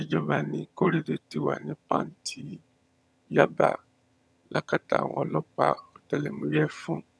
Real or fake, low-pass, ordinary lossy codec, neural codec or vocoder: fake; none; none; vocoder, 22.05 kHz, 80 mel bands, HiFi-GAN